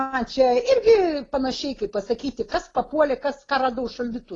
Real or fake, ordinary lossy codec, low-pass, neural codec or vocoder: real; AAC, 32 kbps; 10.8 kHz; none